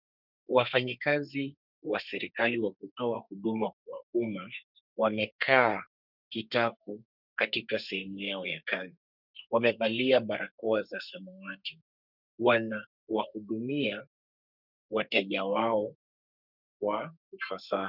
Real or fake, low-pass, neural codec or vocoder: fake; 5.4 kHz; codec, 32 kHz, 1.9 kbps, SNAC